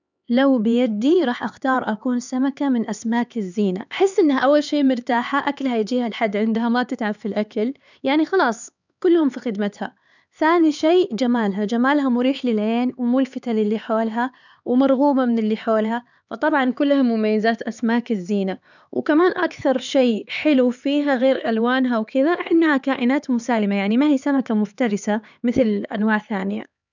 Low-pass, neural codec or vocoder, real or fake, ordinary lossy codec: 7.2 kHz; codec, 16 kHz, 4 kbps, X-Codec, HuBERT features, trained on LibriSpeech; fake; none